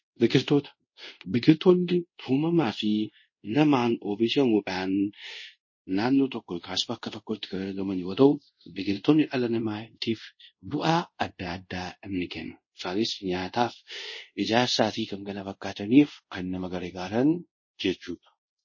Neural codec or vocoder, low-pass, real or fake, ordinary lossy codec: codec, 24 kHz, 0.5 kbps, DualCodec; 7.2 kHz; fake; MP3, 32 kbps